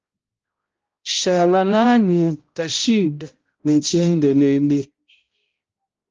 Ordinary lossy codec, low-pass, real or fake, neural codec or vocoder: Opus, 24 kbps; 7.2 kHz; fake; codec, 16 kHz, 0.5 kbps, X-Codec, HuBERT features, trained on balanced general audio